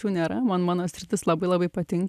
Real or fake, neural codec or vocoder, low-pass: real; none; 14.4 kHz